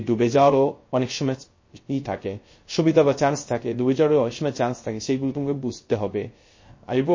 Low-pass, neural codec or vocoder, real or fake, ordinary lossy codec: 7.2 kHz; codec, 16 kHz, 0.3 kbps, FocalCodec; fake; MP3, 32 kbps